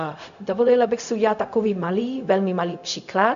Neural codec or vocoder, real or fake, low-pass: codec, 16 kHz, 0.4 kbps, LongCat-Audio-Codec; fake; 7.2 kHz